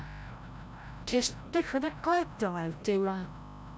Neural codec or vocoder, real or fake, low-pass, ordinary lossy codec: codec, 16 kHz, 0.5 kbps, FreqCodec, larger model; fake; none; none